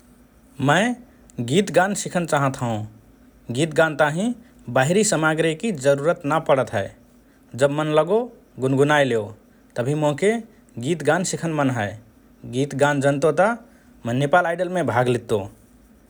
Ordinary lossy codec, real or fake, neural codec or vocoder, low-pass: none; real; none; none